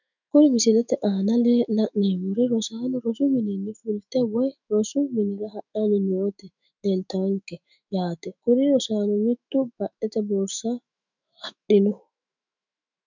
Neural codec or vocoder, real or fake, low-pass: autoencoder, 48 kHz, 128 numbers a frame, DAC-VAE, trained on Japanese speech; fake; 7.2 kHz